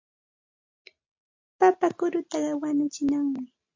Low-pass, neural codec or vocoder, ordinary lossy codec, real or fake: 7.2 kHz; none; MP3, 48 kbps; real